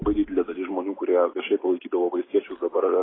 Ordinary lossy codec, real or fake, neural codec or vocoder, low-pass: AAC, 16 kbps; real; none; 7.2 kHz